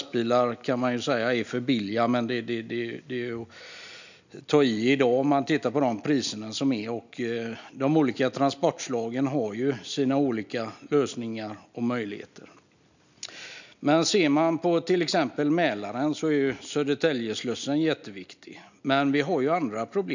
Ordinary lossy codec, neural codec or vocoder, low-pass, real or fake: none; none; 7.2 kHz; real